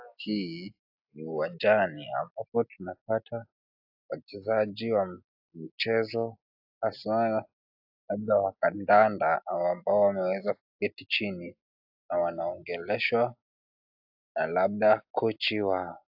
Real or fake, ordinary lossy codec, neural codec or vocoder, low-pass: real; AAC, 48 kbps; none; 5.4 kHz